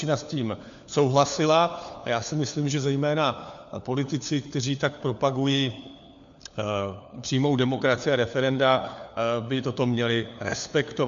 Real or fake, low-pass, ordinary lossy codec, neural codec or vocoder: fake; 7.2 kHz; MP3, 64 kbps; codec, 16 kHz, 4 kbps, FunCodec, trained on LibriTTS, 50 frames a second